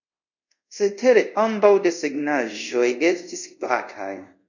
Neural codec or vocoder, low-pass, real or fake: codec, 24 kHz, 0.5 kbps, DualCodec; 7.2 kHz; fake